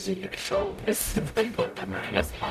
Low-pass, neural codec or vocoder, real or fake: 14.4 kHz; codec, 44.1 kHz, 0.9 kbps, DAC; fake